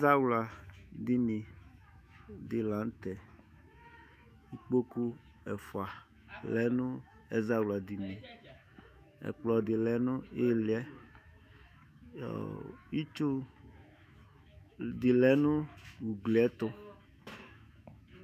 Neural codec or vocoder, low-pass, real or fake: autoencoder, 48 kHz, 128 numbers a frame, DAC-VAE, trained on Japanese speech; 14.4 kHz; fake